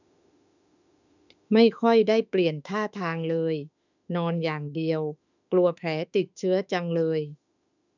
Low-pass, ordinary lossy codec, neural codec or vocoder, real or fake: 7.2 kHz; none; autoencoder, 48 kHz, 32 numbers a frame, DAC-VAE, trained on Japanese speech; fake